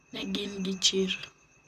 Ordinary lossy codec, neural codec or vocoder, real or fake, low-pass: none; vocoder, 44.1 kHz, 128 mel bands, Pupu-Vocoder; fake; 14.4 kHz